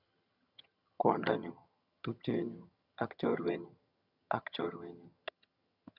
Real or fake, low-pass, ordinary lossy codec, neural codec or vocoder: fake; 5.4 kHz; none; vocoder, 22.05 kHz, 80 mel bands, HiFi-GAN